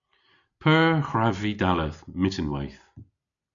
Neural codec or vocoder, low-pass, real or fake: none; 7.2 kHz; real